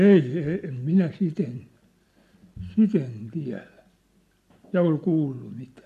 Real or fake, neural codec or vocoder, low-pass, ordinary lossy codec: real; none; 14.4 kHz; MP3, 64 kbps